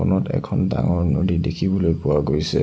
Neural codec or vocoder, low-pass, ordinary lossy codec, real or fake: none; none; none; real